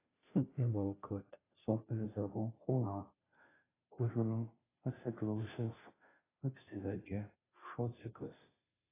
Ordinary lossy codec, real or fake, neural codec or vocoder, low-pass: AAC, 16 kbps; fake; codec, 16 kHz, 0.5 kbps, FunCodec, trained on Chinese and English, 25 frames a second; 3.6 kHz